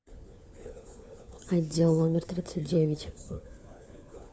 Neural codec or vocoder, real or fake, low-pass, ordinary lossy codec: codec, 16 kHz, 4 kbps, FunCodec, trained on LibriTTS, 50 frames a second; fake; none; none